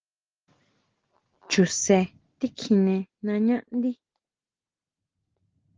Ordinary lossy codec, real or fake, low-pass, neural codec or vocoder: Opus, 16 kbps; real; 7.2 kHz; none